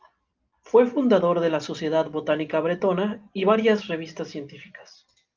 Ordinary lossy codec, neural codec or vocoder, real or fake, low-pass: Opus, 24 kbps; none; real; 7.2 kHz